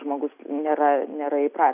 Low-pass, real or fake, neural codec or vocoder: 3.6 kHz; real; none